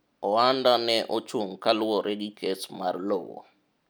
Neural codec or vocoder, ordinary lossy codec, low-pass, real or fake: none; none; none; real